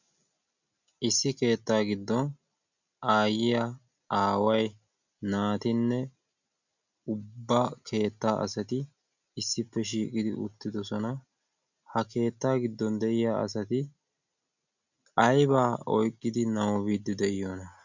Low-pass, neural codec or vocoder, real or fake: 7.2 kHz; none; real